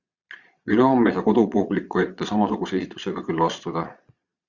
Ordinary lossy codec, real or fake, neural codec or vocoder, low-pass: Opus, 64 kbps; fake; vocoder, 22.05 kHz, 80 mel bands, Vocos; 7.2 kHz